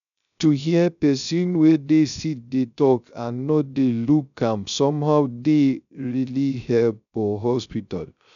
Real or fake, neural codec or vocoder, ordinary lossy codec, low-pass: fake; codec, 16 kHz, 0.3 kbps, FocalCodec; none; 7.2 kHz